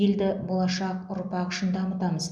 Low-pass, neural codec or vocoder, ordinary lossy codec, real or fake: none; none; none; real